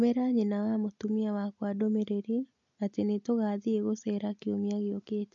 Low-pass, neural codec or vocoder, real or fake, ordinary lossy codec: 7.2 kHz; none; real; MP3, 48 kbps